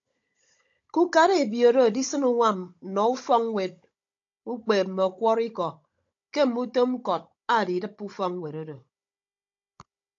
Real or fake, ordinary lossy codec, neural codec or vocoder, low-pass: fake; AAC, 48 kbps; codec, 16 kHz, 16 kbps, FunCodec, trained on Chinese and English, 50 frames a second; 7.2 kHz